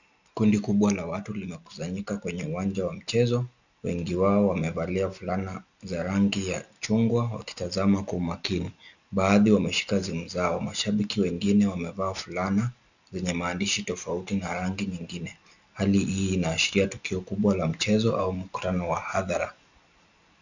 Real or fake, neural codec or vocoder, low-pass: real; none; 7.2 kHz